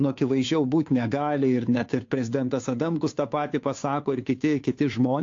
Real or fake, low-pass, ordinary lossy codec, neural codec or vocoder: fake; 7.2 kHz; AAC, 48 kbps; codec, 16 kHz, 2 kbps, FunCodec, trained on Chinese and English, 25 frames a second